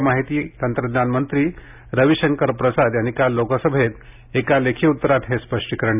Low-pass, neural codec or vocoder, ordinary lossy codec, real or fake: 3.6 kHz; none; none; real